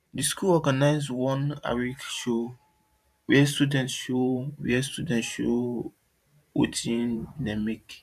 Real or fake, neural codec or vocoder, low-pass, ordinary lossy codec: fake; vocoder, 44.1 kHz, 128 mel bands every 512 samples, BigVGAN v2; 14.4 kHz; none